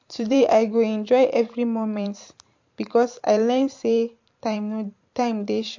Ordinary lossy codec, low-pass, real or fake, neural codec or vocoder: MP3, 48 kbps; 7.2 kHz; fake; vocoder, 44.1 kHz, 128 mel bands every 512 samples, BigVGAN v2